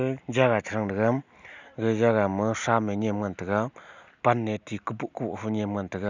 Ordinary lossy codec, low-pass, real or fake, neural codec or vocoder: none; 7.2 kHz; real; none